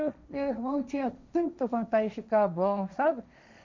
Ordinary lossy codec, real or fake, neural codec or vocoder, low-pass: MP3, 64 kbps; fake; codec, 16 kHz, 1.1 kbps, Voila-Tokenizer; 7.2 kHz